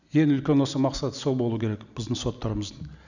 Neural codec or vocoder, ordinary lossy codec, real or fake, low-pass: none; none; real; 7.2 kHz